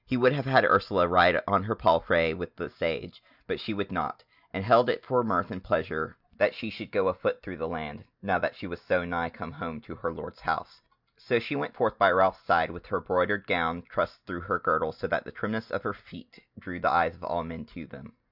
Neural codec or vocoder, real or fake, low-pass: none; real; 5.4 kHz